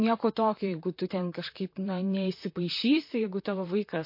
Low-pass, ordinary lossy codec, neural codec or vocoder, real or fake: 5.4 kHz; MP3, 32 kbps; vocoder, 44.1 kHz, 128 mel bands, Pupu-Vocoder; fake